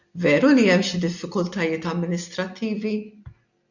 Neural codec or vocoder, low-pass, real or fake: none; 7.2 kHz; real